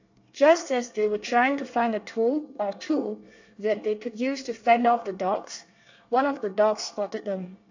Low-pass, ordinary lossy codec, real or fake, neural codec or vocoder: 7.2 kHz; AAC, 48 kbps; fake; codec, 24 kHz, 1 kbps, SNAC